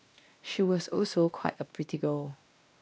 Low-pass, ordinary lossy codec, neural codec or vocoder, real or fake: none; none; codec, 16 kHz, 1 kbps, X-Codec, WavLM features, trained on Multilingual LibriSpeech; fake